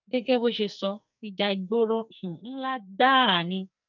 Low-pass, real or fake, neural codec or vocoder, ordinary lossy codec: 7.2 kHz; fake; codec, 44.1 kHz, 2.6 kbps, SNAC; none